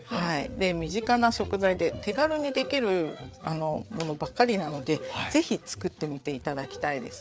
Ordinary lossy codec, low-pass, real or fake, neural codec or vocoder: none; none; fake; codec, 16 kHz, 4 kbps, FreqCodec, larger model